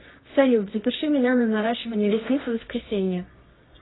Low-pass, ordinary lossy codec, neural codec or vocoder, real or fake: 7.2 kHz; AAC, 16 kbps; codec, 24 kHz, 0.9 kbps, WavTokenizer, medium music audio release; fake